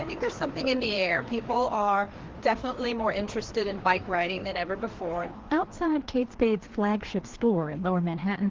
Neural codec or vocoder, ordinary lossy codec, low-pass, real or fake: codec, 16 kHz, 2 kbps, FreqCodec, larger model; Opus, 16 kbps; 7.2 kHz; fake